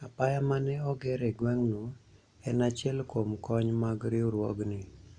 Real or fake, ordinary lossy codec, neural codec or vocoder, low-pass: real; none; none; 9.9 kHz